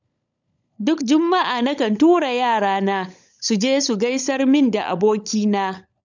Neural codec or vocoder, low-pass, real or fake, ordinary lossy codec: codec, 16 kHz, 16 kbps, FunCodec, trained on LibriTTS, 50 frames a second; 7.2 kHz; fake; none